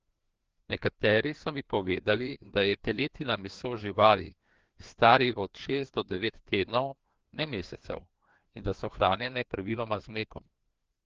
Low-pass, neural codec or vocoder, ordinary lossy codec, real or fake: 7.2 kHz; codec, 16 kHz, 2 kbps, FreqCodec, larger model; Opus, 16 kbps; fake